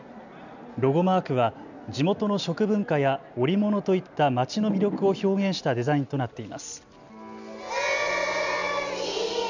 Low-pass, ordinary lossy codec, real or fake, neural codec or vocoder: 7.2 kHz; none; real; none